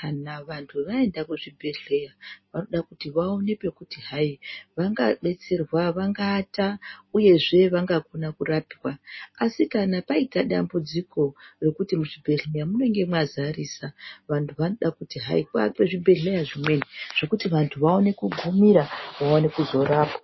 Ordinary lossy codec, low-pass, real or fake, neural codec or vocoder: MP3, 24 kbps; 7.2 kHz; real; none